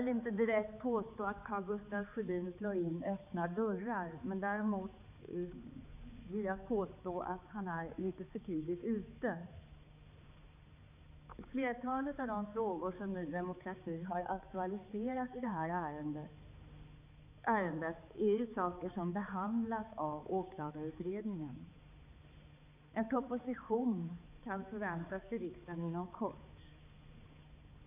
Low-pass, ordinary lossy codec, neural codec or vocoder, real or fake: 3.6 kHz; none; codec, 16 kHz, 4 kbps, X-Codec, HuBERT features, trained on balanced general audio; fake